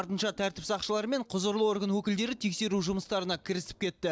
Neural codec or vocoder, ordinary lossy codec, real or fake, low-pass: none; none; real; none